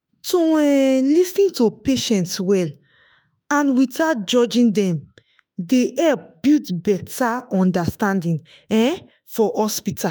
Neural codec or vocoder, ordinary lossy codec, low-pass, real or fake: autoencoder, 48 kHz, 32 numbers a frame, DAC-VAE, trained on Japanese speech; none; none; fake